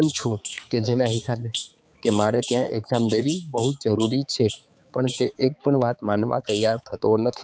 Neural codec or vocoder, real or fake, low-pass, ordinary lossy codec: codec, 16 kHz, 4 kbps, X-Codec, HuBERT features, trained on balanced general audio; fake; none; none